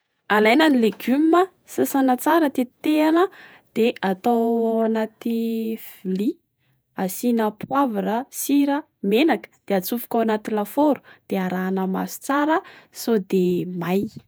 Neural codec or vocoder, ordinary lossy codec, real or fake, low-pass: vocoder, 48 kHz, 128 mel bands, Vocos; none; fake; none